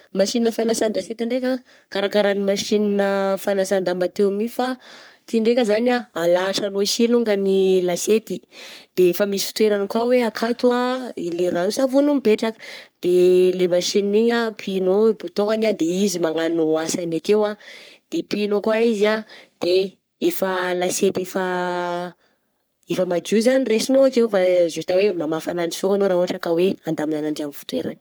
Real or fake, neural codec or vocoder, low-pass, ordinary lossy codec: fake; codec, 44.1 kHz, 3.4 kbps, Pupu-Codec; none; none